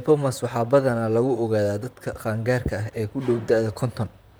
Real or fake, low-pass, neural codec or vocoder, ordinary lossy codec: fake; none; vocoder, 44.1 kHz, 128 mel bands, Pupu-Vocoder; none